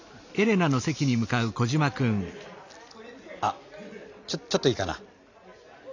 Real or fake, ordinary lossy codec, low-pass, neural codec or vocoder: real; none; 7.2 kHz; none